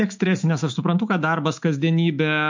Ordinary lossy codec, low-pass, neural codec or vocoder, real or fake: MP3, 48 kbps; 7.2 kHz; autoencoder, 48 kHz, 128 numbers a frame, DAC-VAE, trained on Japanese speech; fake